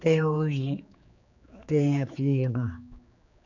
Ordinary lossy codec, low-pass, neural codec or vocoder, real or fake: none; 7.2 kHz; codec, 16 kHz, 4 kbps, X-Codec, HuBERT features, trained on general audio; fake